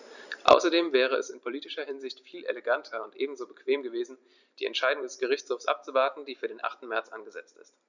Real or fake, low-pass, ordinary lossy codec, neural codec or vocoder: fake; 7.2 kHz; none; vocoder, 44.1 kHz, 128 mel bands every 256 samples, BigVGAN v2